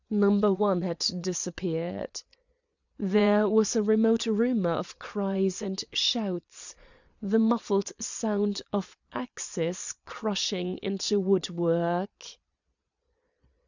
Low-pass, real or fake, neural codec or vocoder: 7.2 kHz; fake; vocoder, 22.05 kHz, 80 mel bands, Vocos